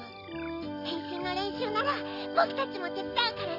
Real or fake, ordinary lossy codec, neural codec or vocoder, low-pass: real; AAC, 48 kbps; none; 5.4 kHz